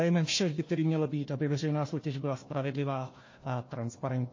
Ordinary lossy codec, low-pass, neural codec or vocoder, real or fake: MP3, 32 kbps; 7.2 kHz; codec, 16 kHz, 1 kbps, FunCodec, trained on Chinese and English, 50 frames a second; fake